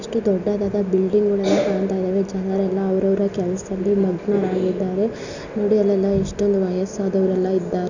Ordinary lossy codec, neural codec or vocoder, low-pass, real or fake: none; none; 7.2 kHz; real